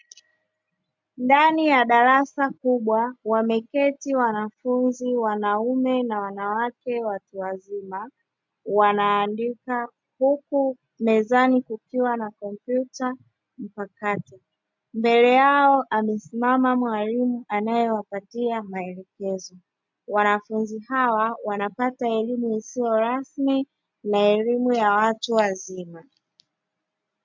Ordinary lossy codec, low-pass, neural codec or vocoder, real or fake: MP3, 64 kbps; 7.2 kHz; none; real